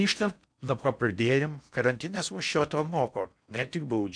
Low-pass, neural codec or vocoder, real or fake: 9.9 kHz; codec, 16 kHz in and 24 kHz out, 0.6 kbps, FocalCodec, streaming, 4096 codes; fake